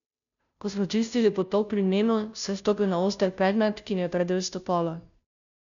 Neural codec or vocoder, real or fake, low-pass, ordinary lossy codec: codec, 16 kHz, 0.5 kbps, FunCodec, trained on Chinese and English, 25 frames a second; fake; 7.2 kHz; none